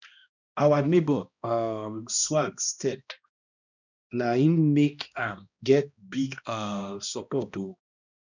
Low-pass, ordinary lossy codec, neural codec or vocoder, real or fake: 7.2 kHz; none; codec, 16 kHz, 1 kbps, X-Codec, HuBERT features, trained on balanced general audio; fake